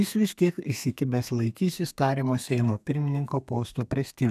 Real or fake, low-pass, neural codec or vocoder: fake; 14.4 kHz; codec, 32 kHz, 1.9 kbps, SNAC